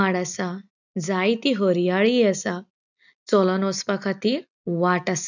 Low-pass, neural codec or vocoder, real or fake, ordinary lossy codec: 7.2 kHz; none; real; none